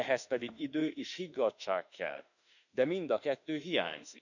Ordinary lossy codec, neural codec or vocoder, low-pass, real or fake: none; autoencoder, 48 kHz, 32 numbers a frame, DAC-VAE, trained on Japanese speech; 7.2 kHz; fake